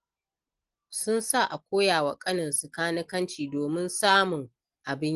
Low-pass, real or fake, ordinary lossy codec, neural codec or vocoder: 10.8 kHz; real; Opus, 24 kbps; none